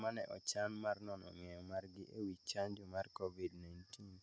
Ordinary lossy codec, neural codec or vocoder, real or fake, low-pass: none; none; real; none